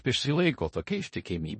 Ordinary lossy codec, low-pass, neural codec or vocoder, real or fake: MP3, 32 kbps; 10.8 kHz; codec, 16 kHz in and 24 kHz out, 0.4 kbps, LongCat-Audio-Codec, four codebook decoder; fake